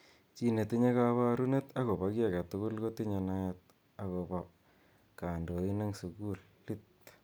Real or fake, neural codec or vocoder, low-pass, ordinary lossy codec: real; none; none; none